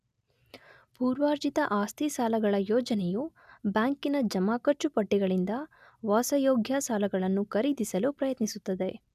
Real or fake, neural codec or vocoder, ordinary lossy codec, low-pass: real; none; none; 14.4 kHz